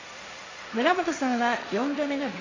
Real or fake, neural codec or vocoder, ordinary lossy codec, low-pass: fake; codec, 16 kHz, 1.1 kbps, Voila-Tokenizer; AAC, 32 kbps; 7.2 kHz